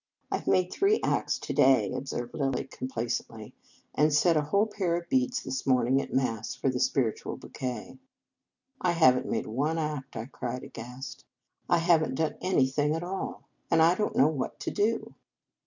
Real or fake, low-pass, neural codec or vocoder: real; 7.2 kHz; none